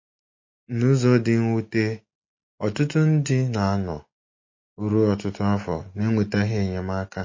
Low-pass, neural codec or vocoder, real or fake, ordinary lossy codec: 7.2 kHz; none; real; MP3, 32 kbps